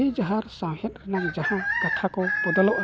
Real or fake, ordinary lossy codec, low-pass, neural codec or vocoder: real; none; none; none